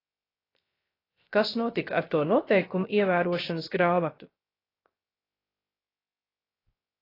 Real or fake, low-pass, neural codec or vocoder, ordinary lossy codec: fake; 5.4 kHz; codec, 16 kHz, 0.3 kbps, FocalCodec; AAC, 24 kbps